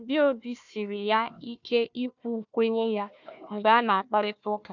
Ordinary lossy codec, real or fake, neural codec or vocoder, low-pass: none; fake; codec, 16 kHz, 1 kbps, FunCodec, trained on Chinese and English, 50 frames a second; 7.2 kHz